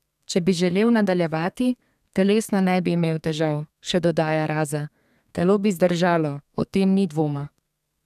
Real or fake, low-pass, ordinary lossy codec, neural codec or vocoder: fake; 14.4 kHz; none; codec, 32 kHz, 1.9 kbps, SNAC